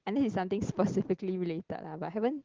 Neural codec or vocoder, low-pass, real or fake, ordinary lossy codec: none; 7.2 kHz; real; Opus, 16 kbps